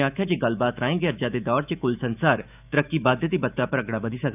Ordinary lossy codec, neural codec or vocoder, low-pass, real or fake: none; autoencoder, 48 kHz, 128 numbers a frame, DAC-VAE, trained on Japanese speech; 3.6 kHz; fake